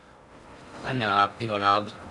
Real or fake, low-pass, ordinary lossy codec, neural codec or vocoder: fake; 10.8 kHz; Opus, 64 kbps; codec, 16 kHz in and 24 kHz out, 0.6 kbps, FocalCodec, streaming, 4096 codes